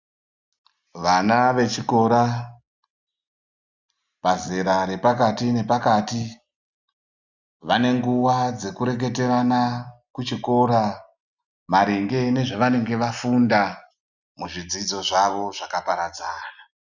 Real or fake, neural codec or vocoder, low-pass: real; none; 7.2 kHz